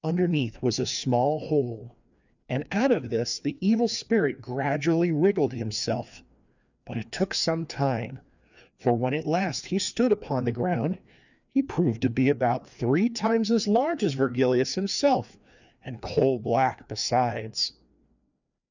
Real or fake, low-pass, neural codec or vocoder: fake; 7.2 kHz; codec, 16 kHz, 2 kbps, FreqCodec, larger model